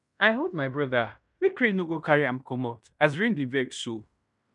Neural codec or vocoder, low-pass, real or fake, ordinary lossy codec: codec, 16 kHz in and 24 kHz out, 0.9 kbps, LongCat-Audio-Codec, fine tuned four codebook decoder; 10.8 kHz; fake; none